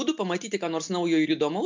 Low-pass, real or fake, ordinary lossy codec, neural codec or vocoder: 7.2 kHz; real; MP3, 64 kbps; none